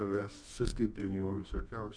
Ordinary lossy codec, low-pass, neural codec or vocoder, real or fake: MP3, 64 kbps; 9.9 kHz; codec, 24 kHz, 0.9 kbps, WavTokenizer, medium music audio release; fake